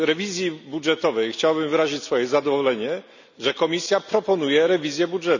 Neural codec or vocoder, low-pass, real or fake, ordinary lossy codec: none; 7.2 kHz; real; none